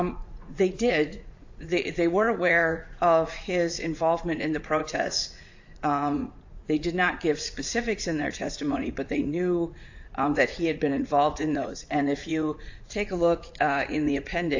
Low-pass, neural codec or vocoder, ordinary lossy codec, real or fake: 7.2 kHz; vocoder, 44.1 kHz, 80 mel bands, Vocos; AAC, 48 kbps; fake